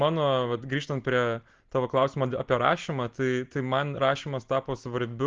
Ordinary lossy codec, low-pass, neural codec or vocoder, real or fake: Opus, 16 kbps; 7.2 kHz; none; real